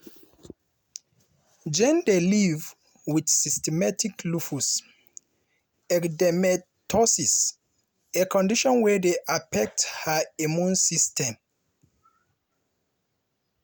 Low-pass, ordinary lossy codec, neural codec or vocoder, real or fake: none; none; none; real